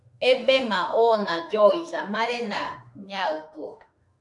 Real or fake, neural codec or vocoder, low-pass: fake; autoencoder, 48 kHz, 32 numbers a frame, DAC-VAE, trained on Japanese speech; 10.8 kHz